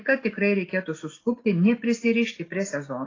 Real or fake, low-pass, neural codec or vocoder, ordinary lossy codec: real; 7.2 kHz; none; AAC, 32 kbps